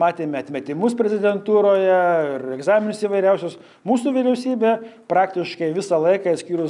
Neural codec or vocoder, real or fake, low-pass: none; real; 10.8 kHz